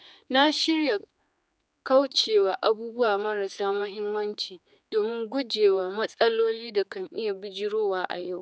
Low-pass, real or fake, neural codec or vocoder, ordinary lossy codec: none; fake; codec, 16 kHz, 4 kbps, X-Codec, HuBERT features, trained on general audio; none